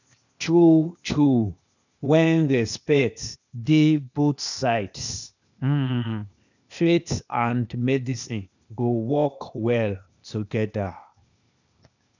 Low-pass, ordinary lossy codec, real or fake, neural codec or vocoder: 7.2 kHz; none; fake; codec, 16 kHz, 0.8 kbps, ZipCodec